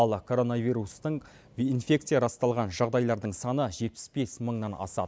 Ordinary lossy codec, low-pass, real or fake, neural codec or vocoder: none; none; real; none